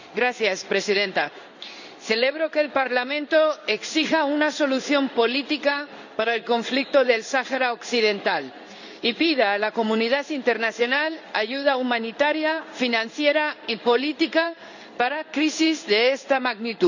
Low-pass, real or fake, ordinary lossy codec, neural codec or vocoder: 7.2 kHz; fake; none; codec, 16 kHz in and 24 kHz out, 1 kbps, XY-Tokenizer